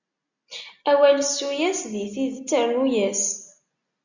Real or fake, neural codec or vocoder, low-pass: real; none; 7.2 kHz